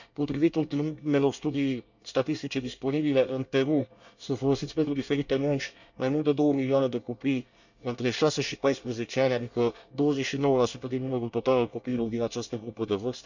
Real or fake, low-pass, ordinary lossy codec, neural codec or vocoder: fake; 7.2 kHz; none; codec, 24 kHz, 1 kbps, SNAC